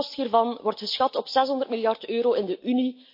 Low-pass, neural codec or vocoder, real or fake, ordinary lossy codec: 5.4 kHz; none; real; MP3, 32 kbps